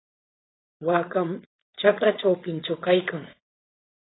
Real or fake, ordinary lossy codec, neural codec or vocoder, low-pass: fake; AAC, 16 kbps; codec, 16 kHz, 4.8 kbps, FACodec; 7.2 kHz